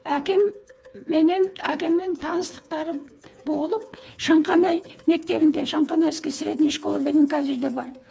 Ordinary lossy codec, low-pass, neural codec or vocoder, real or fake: none; none; codec, 16 kHz, 4 kbps, FreqCodec, smaller model; fake